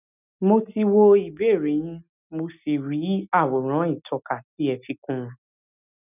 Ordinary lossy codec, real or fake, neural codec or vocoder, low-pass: none; real; none; 3.6 kHz